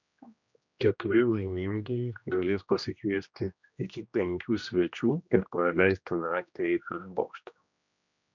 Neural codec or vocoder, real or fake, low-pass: codec, 16 kHz, 1 kbps, X-Codec, HuBERT features, trained on general audio; fake; 7.2 kHz